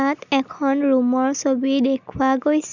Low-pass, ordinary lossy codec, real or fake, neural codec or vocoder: 7.2 kHz; none; real; none